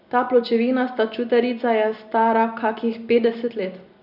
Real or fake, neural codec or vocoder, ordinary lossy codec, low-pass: real; none; none; 5.4 kHz